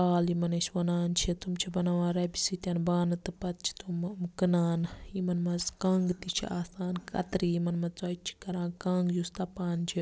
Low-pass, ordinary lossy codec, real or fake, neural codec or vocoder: none; none; real; none